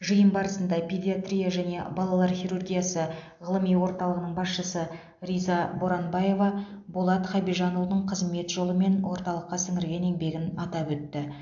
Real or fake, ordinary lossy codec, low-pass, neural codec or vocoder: real; AAC, 64 kbps; 7.2 kHz; none